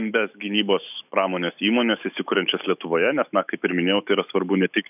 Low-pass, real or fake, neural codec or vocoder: 3.6 kHz; fake; autoencoder, 48 kHz, 128 numbers a frame, DAC-VAE, trained on Japanese speech